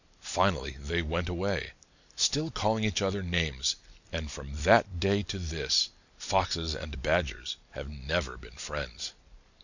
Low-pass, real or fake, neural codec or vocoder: 7.2 kHz; real; none